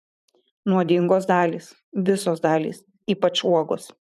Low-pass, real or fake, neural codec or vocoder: 14.4 kHz; fake; vocoder, 44.1 kHz, 128 mel bands every 512 samples, BigVGAN v2